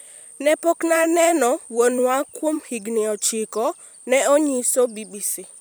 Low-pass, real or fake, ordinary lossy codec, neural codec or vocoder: none; fake; none; vocoder, 44.1 kHz, 128 mel bands every 256 samples, BigVGAN v2